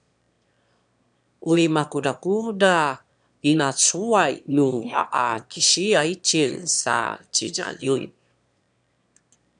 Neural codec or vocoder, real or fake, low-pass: autoencoder, 22.05 kHz, a latent of 192 numbers a frame, VITS, trained on one speaker; fake; 9.9 kHz